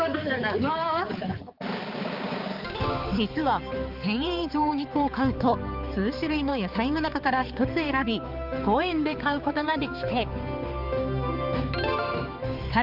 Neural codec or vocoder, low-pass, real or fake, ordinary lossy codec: codec, 16 kHz, 4 kbps, X-Codec, HuBERT features, trained on balanced general audio; 5.4 kHz; fake; Opus, 32 kbps